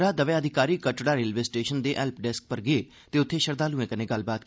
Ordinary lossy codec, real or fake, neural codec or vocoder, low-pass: none; real; none; none